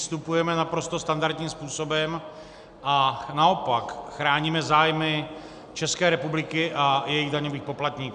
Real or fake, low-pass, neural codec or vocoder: real; 9.9 kHz; none